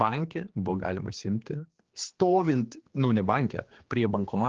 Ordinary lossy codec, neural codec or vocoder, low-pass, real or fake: Opus, 16 kbps; codec, 16 kHz, 2 kbps, X-Codec, HuBERT features, trained on general audio; 7.2 kHz; fake